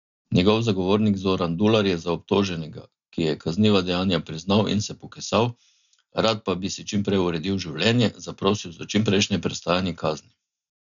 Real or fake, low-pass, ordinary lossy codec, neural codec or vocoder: real; 7.2 kHz; none; none